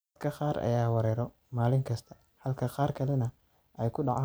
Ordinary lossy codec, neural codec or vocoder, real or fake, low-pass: none; none; real; none